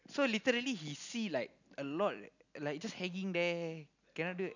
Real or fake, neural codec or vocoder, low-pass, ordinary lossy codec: real; none; 7.2 kHz; none